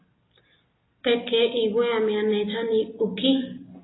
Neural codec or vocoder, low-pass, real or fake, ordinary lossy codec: none; 7.2 kHz; real; AAC, 16 kbps